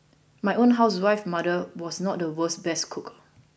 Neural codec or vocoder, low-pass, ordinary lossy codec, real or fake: none; none; none; real